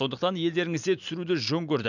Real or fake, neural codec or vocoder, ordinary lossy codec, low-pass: real; none; none; 7.2 kHz